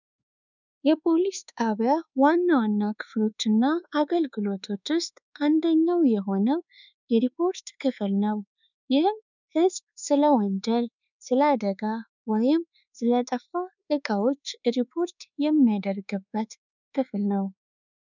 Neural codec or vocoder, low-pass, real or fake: codec, 24 kHz, 1.2 kbps, DualCodec; 7.2 kHz; fake